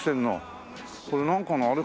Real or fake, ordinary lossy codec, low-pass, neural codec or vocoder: real; none; none; none